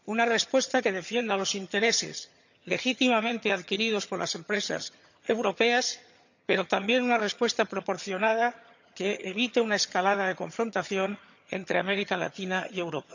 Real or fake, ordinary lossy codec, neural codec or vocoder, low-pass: fake; none; vocoder, 22.05 kHz, 80 mel bands, HiFi-GAN; 7.2 kHz